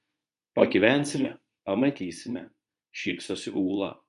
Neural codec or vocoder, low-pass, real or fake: codec, 24 kHz, 0.9 kbps, WavTokenizer, medium speech release version 2; 10.8 kHz; fake